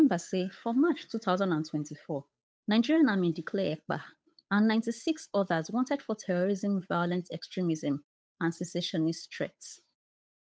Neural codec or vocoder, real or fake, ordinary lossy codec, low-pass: codec, 16 kHz, 8 kbps, FunCodec, trained on Chinese and English, 25 frames a second; fake; none; none